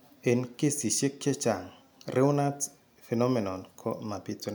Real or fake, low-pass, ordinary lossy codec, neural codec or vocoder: real; none; none; none